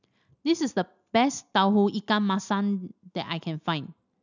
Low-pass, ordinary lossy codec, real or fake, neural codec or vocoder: 7.2 kHz; none; real; none